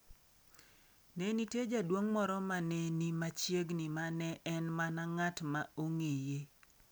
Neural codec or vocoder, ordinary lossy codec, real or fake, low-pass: none; none; real; none